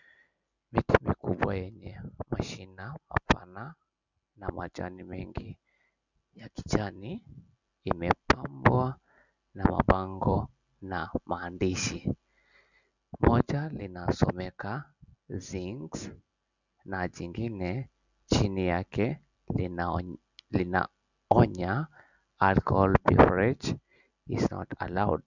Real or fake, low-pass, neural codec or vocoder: real; 7.2 kHz; none